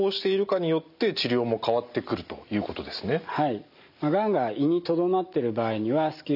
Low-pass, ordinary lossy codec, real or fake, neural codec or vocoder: 5.4 kHz; none; real; none